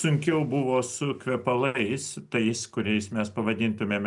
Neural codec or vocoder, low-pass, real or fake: none; 10.8 kHz; real